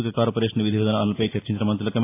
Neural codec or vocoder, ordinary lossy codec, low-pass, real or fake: none; AAC, 24 kbps; 3.6 kHz; real